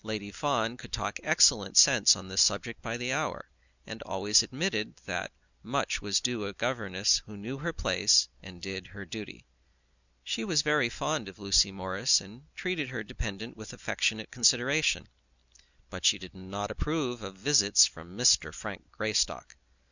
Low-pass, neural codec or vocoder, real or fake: 7.2 kHz; none; real